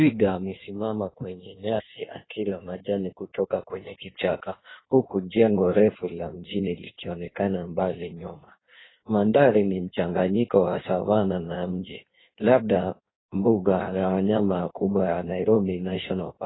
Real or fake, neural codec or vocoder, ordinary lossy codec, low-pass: fake; codec, 16 kHz in and 24 kHz out, 1.1 kbps, FireRedTTS-2 codec; AAC, 16 kbps; 7.2 kHz